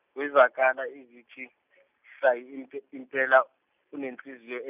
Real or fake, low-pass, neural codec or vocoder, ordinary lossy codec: real; 3.6 kHz; none; none